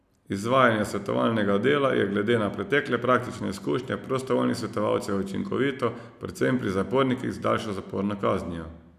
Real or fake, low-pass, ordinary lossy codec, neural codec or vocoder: real; 14.4 kHz; none; none